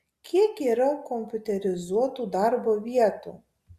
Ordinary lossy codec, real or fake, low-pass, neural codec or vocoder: Opus, 64 kbps; real; 14.4 kHz; none